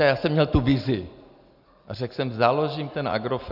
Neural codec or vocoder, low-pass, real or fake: none; 5.4 kHz; real